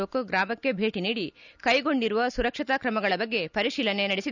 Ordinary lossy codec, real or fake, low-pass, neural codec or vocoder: none; real; 7.2 kHz; none